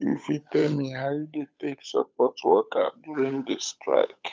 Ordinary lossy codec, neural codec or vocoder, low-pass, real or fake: none; codec, 16 kHz, 8 kbps, FunCodec, trained on Chinese and English, 25 frames a second; none; fake